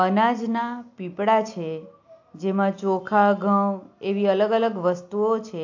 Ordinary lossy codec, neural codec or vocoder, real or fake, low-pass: AAC, 48 kbps; none; real; 7.2 kHz